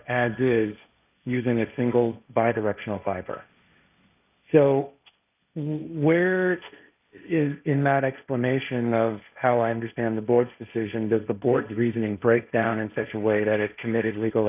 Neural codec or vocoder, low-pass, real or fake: codec, 16 kHz, 1.1 kbps, Voila-Tokenizer; 3.6 kHz; fake